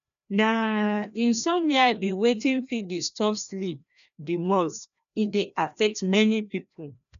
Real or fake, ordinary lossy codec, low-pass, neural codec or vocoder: fake; none; 7.2 kHz; codec, 16 kHz, 1 kbps, FreqCodec, larger model